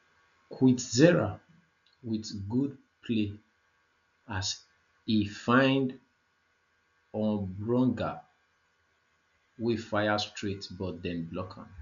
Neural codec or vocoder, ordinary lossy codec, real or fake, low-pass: none; none; real; 7.2 kHz